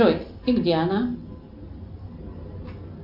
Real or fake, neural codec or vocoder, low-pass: real; none; 5.4 kHz